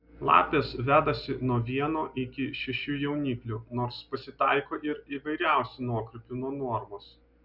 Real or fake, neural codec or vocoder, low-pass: real; none; 5.4 kHz